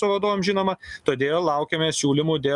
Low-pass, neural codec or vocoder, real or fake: 10.8 kHz; none; real